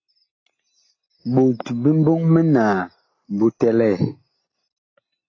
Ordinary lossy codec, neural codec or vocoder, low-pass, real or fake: AAC, 32 kbps; none; 7.2 kHz; real